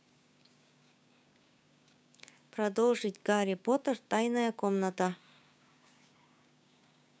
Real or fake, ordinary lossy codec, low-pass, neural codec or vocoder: fake; none; none; codec, 16 kHz, 6 kbps, DAC